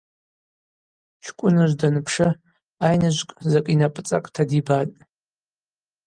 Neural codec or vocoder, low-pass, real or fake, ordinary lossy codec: none; 9.9 kHz; real; Opus, 32 kbps